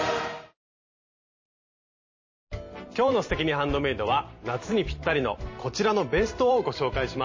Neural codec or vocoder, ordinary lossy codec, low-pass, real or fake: vocoder, 44.1 kHz, 128 mel bands every 512 samples, BigVGAN v2; MP3, 32 kbps; 7.2 kHz; fake